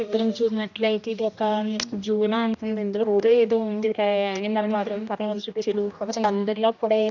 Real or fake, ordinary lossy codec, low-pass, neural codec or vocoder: fake; none; 7.2 kHz; codec, 16 kHz, 1 kbps, X-Codec, HuBERT features, trained on general audio